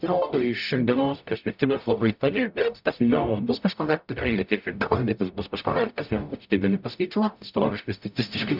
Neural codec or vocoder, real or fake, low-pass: codec, 44.1 kHz, 0.9 kbps, DAC; fake; 5.4 kHz